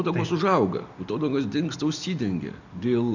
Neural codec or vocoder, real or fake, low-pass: none; real; 7.2 kHz